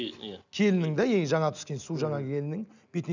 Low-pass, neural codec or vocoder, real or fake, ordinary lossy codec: 7.2 kHz; none; real; none